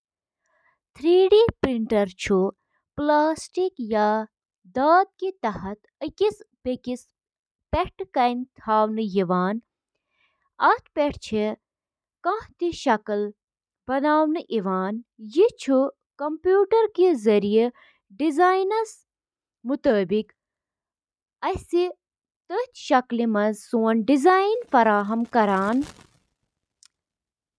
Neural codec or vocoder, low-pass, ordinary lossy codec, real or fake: none; none; none; real